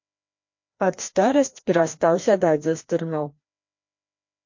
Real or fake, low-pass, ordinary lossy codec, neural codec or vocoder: fake; 7.2 kHz; MP3, 48 kbps; codec, 16 kHz, 1 kbps, FreqCodec, larger model